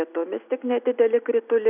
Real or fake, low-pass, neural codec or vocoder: real; 3.6 kHz; none